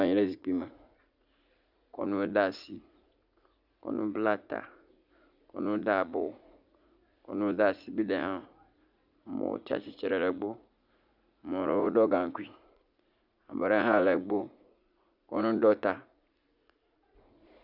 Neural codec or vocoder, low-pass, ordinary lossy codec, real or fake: vocoder, 44.1 kHz, 80 mel bands, Vocos; 5.4 kHz; Opus, 64 kbps; fake